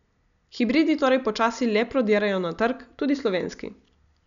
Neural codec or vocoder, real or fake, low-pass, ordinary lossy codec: none; real; 7.2 kHz; none